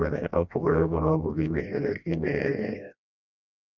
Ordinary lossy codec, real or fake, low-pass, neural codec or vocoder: none; fake; 7.2 kHz; codec, 16 kHz, 1 kbps, FreqCodec, smaller model